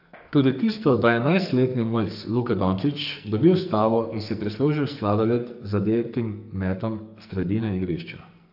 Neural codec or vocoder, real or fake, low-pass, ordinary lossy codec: codec, 44.1 kHz, 2.6 kbps, SNAC; fake; 5.4 kHz; AAC, 48 kbps